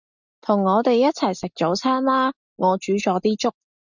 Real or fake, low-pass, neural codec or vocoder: real; 7.2 kHz; none